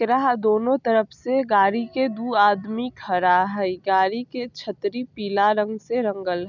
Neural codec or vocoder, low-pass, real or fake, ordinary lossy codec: none; 7.2 kHz; real; none